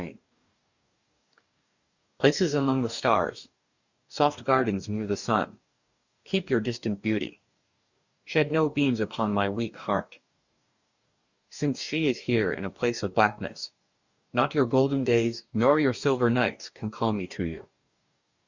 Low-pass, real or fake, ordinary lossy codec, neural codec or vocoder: 7.2 kHz; fake; Opus, 64 kbps; codec, 44.1 kHz, 2.6 kbps, DAC